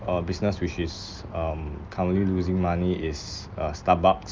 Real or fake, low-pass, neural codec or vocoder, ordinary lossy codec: real; 7.2 kHz; none; Opus, 24 kbps